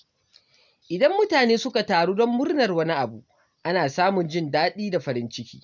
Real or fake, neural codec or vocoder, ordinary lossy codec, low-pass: real; none; none; 7.2 kHz